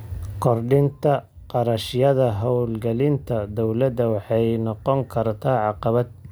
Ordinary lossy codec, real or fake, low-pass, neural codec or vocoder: none; real; none; none